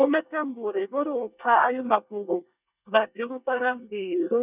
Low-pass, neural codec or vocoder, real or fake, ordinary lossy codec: 3.6 kHz; codec, 24 kHz, 1 kbps, SNAC; fake; none